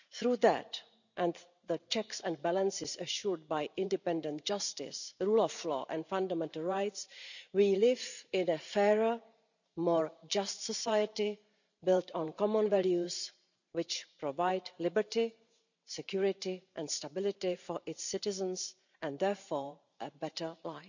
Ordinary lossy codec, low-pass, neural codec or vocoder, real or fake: none; 7.2 kHz; vocoder, 44.1 kHz, 128 mel bands every 512 samples, BigVGAN v2; fake